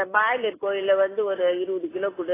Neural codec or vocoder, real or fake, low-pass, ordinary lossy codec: none; real; 3.6 kHz; AAC, 16 kbps